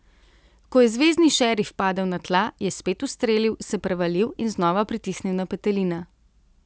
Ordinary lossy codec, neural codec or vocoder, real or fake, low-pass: none; none; real; none